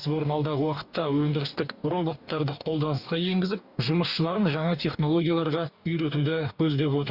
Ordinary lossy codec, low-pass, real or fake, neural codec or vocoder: Opus, 64 kbps; 5.4 kHz; fake; codec, 44.1 kHz, 2.6 kbps, DAC